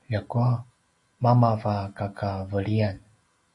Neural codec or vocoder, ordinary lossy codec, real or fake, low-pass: none; AAC, 48 kbps; real; 10.8 kHz